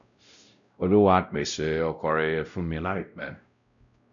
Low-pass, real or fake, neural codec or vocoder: 7.2 kHz; fake; codec, 16 kHz, 0.5 kbps, X-Codec, WavLM features, trained on Multilingual LibriSpeech